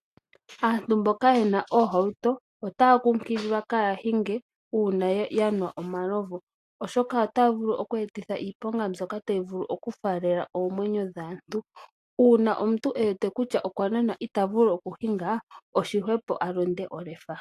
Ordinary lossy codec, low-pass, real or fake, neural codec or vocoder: AAC, 64 kbps; 14.4 kHz; real; none